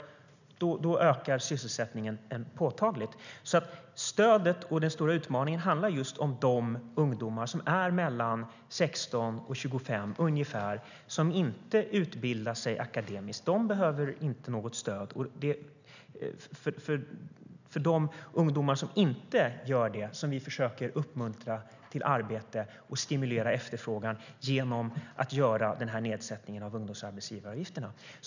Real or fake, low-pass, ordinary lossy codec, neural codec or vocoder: real; 7.2 kHz; none; none